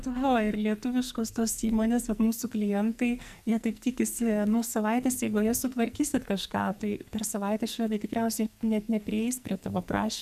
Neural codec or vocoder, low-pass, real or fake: codec, 44.1 kHz, 2.6 kbps, SNAC; 14.4 kHz; fake